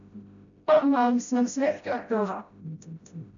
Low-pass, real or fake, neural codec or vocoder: 7.2 kHz; fake; codec, 16 kHz, 0.5 kbps, FreqCodec, smaller model